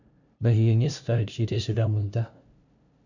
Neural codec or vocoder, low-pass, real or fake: codec, 16 kHz, 0.5 kbps, FunCodec, trained on LibriTTS, 25 frames a second; 7.2 kHz; fake